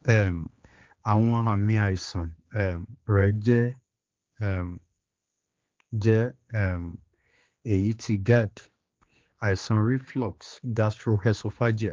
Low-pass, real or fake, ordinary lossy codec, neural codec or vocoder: 7.2 kHz; fake; Opus, 32 kbps; codec, 16 kHz, 2 kbps, X-Codec, HuBERT features, trained on general audio